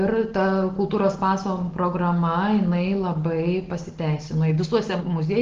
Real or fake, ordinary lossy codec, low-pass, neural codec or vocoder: real; Opus, 16 kbps; 7.2 kHz; none